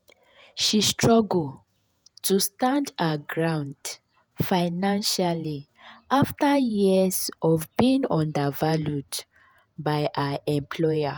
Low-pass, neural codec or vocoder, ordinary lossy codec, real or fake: none; vocoder, 48 kHz, 128 mel bands, Vocos; none; fake